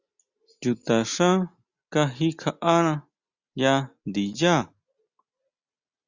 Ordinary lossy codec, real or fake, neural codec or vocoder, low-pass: Opus, 64 kbps; real; none; 7.2 kHz